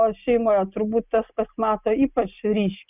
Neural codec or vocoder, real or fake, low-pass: none; real; 3.6 kHz